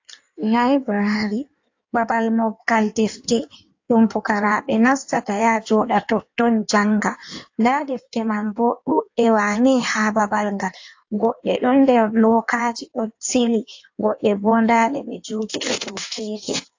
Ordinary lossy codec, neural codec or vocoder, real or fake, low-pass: AAC, 48 kbps; codec, 16 kHz in and 24 kHz out, 1.1 kbps, FireRedTTS-2 codec; fake; 7.2 kHz